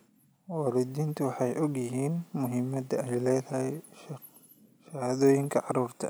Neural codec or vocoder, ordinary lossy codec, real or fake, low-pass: none; none; real; none